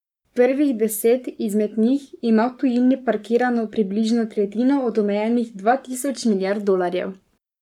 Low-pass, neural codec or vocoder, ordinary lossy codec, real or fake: 19.8 kHz; codec, 44.1 kHz, 7.8 kbps, Pupu-Codec; none; fake